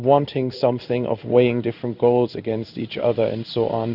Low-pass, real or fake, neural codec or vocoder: 5.4 kHz; fake; codec, 16 kHz in and 24 kHz out, 1 kbps, XY-Tokenizer